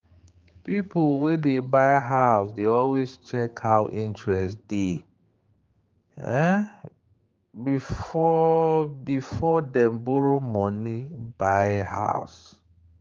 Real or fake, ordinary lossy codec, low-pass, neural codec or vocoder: fake; Opus, 32 kbps; 7.2 kHz; codec, 16 kHz, 4 kbps, X-Codec, HuBERT features, trained on general audio